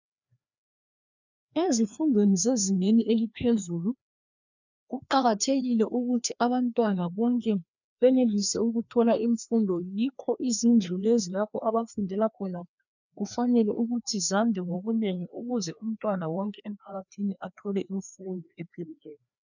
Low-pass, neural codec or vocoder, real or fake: 7.2 kHz; codec, 16 kHz, 2 kbps, FreqCodec, larger model; fake